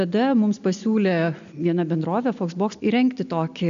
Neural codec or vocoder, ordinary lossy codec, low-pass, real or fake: none; AAC, 96 kbps; 7.2 kHz; real